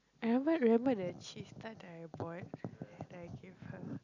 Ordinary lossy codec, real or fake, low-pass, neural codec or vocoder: none; real; 7.2 kHz; none